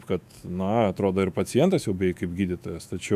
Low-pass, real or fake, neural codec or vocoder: 14.4 kHz; fake; autoencoder, 48 kHz, 128 numbers a frame, DAC-VAE, trained on Japanese speech